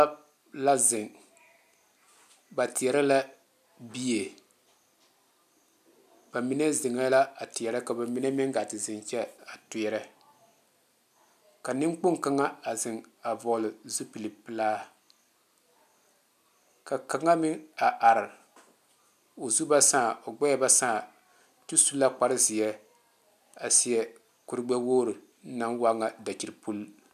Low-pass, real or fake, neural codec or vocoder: 14.4 kHz; real; none